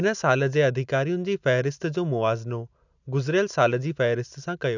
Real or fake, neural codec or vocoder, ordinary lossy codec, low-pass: real; none; none; 7.2 kHz